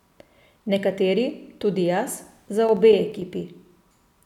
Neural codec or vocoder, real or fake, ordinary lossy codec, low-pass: none; real; none; 19.8 kHz